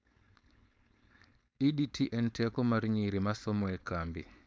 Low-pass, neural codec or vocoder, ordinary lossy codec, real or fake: none; codec, 16 kHz, 4.8 kbps, FACodec; none; fake